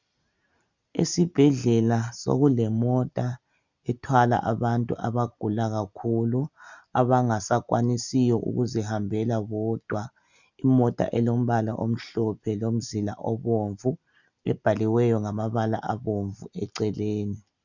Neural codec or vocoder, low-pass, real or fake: none; 7.2 kHz; real